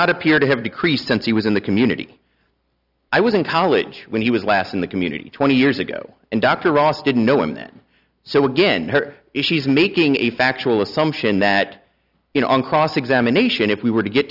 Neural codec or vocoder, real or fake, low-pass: none; real; 5.4 kHz